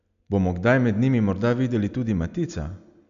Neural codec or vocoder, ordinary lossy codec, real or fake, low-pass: none; none; real; 7.2 kHz